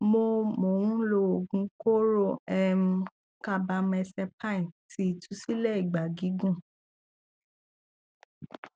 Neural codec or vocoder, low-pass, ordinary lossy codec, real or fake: none; none; none; real